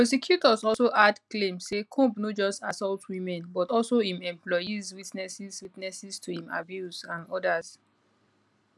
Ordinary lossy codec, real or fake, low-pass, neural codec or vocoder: none; real; none; none